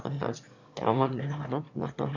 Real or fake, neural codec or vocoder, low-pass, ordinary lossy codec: fake; autoencoder, 22.05 kHz, a latent of 192 numbers a frame, VITS, trained on one speaker; 7.2 kHz; none